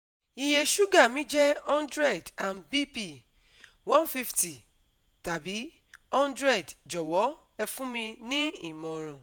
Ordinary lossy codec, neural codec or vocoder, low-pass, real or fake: none; vocoder, 48 kHz, 128 mel bands, Vocos; none; fake